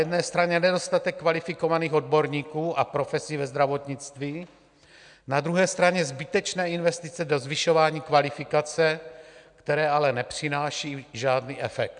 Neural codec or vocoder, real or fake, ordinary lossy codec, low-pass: none; real; MP3, 96 kbps; 9.9 kHz